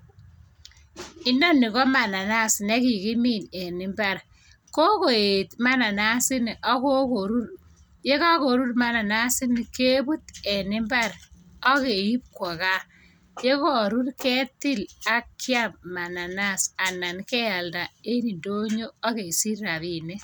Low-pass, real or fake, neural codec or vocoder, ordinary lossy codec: none; real; none; none